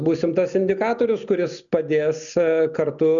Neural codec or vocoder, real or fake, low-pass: none; real; 7.2 kHz